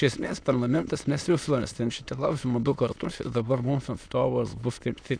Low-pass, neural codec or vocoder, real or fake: 9.9 kHz; autoencoder, 22.05 kHz, a latent of 192 numbers a frame, VITS, trained on many speakers; fake